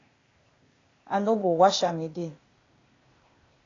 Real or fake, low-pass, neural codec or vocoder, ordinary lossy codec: fake; 7.2 kHz; codec, 16 kHz, 0.8 kbps, ZipCodec; AAC, 32 kbps